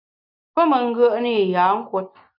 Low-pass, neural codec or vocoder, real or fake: 5.4 kHz; none; real